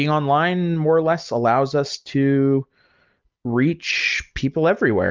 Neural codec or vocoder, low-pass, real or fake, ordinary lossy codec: none; 7.2 kHz; real; Opus, 24 kbps